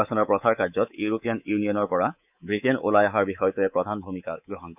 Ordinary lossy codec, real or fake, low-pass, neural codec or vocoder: none; fake; 3.6 kHz; codec, 24 kHz, 3.1 kbps, DualCodec